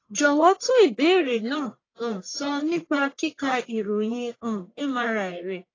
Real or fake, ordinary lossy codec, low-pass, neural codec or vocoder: fake; AAC, 32 kbps; 7.2 kHz; codec, 44.1 kHz, 1.7 kbps, Pupu-Codec